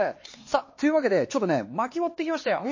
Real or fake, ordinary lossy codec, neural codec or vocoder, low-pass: fake; MP3, 32 kbps; codec, 16 kHz, 4 kbps, X-Codec, HuBERT features, trained on LibriSpeech; 7.2 kHz